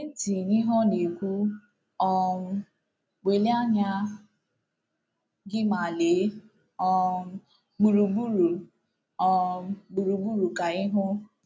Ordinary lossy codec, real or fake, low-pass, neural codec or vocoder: none; real; none; none